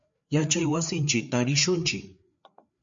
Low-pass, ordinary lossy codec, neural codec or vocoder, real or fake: 7.2 kHz; MP3, 48 kbps; codec, 16 kHz, 4 kbps, FreqCodec, larger model; fake